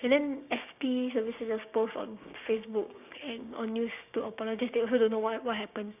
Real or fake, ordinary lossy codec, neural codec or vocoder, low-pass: fake; none; codec, 16 kHz, 6 kbps, DAC; 3.6 kHz